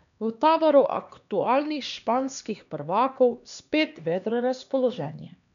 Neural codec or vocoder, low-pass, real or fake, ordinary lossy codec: codec, 16 kHz, 2 kbps, X-Codec, HuBERT features, trained on LibriSpeech; 7.2 kHz; fake; none